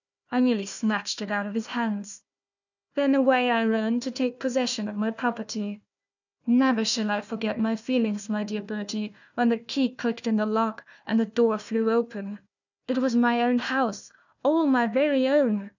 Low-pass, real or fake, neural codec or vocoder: 7.2 kHz; fake; codec, 16 kHz, 1 kbps, FunCodec, trained on Chinese and English, 50 frames a second